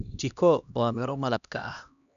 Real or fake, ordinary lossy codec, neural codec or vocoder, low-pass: fake; none; codec, 16 kHz, 1 kbps, X-Codec, HuBERT features, trained on LibriSpeech; 7.2 kHz